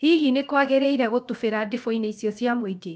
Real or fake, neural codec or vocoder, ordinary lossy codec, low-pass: fake; codec, 16 kHz, 0.7 kbps, FocalCodec; none; none